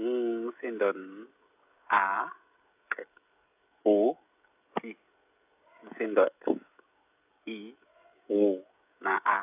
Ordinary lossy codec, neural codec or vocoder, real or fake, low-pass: AAC, 32 kbps; codec, 16 kHz, 16 kbps, FreqCodec, smaller model; fake; 3.6 kHz